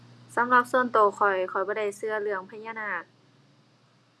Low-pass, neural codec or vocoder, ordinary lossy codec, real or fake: none; none; none; real